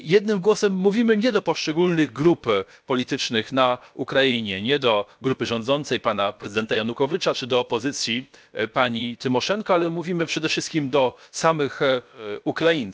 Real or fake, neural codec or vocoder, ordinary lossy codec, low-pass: fake; codec, 16 kHz, about 1 kbps, DyCAST, with the encoder's durations; none; none